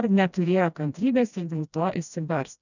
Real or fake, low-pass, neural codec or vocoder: fake; 7.2 kHz; codec, 16 kHz, 1 kbps, FreqCodec, smaller model